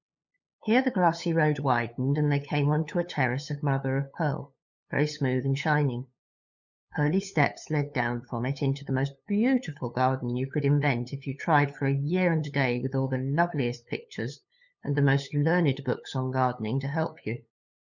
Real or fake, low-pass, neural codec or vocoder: fake; 7.2 kHz; codec, 16 kHz, 8 kbps, FunCodec, trained on LibriTTS, 25 frames a second